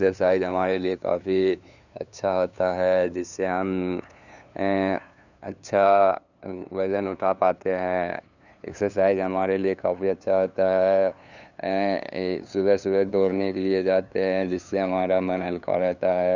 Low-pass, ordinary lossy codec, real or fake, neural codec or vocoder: 7.2 kHz; none; fake; codec, 16 kHz, 2 kbps, FunCodec, trained on LibriTTS, 25 frames a second